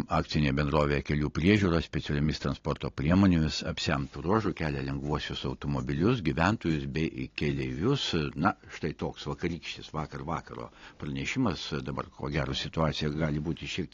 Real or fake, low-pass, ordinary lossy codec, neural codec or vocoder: real; 7.2 kHz; AAC, 32 kbps; none